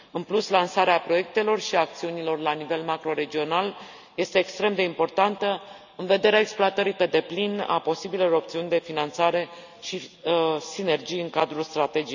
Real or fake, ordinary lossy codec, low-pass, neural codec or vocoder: real; none; 7.2 kHz; none